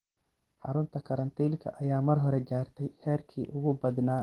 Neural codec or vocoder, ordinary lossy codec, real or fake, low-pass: none; Opus, 16 kbps; real; 19.8 kHz